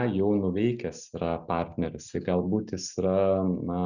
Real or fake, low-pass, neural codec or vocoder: real; 7.2 kHz; none